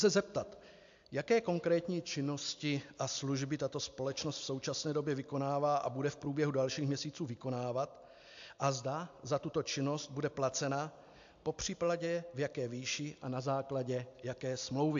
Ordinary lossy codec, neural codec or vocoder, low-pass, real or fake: AAC, 64 kbps; none; 7.2 kHz; real